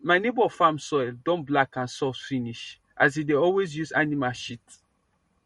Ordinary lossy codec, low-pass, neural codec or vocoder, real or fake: MP3, 48 kbps; 19.8 kHz; none; real